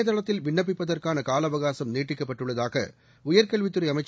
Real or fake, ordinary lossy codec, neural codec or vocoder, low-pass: real; none; none; none